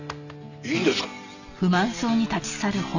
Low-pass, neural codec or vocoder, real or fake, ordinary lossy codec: 7.2 kHz; none; real; none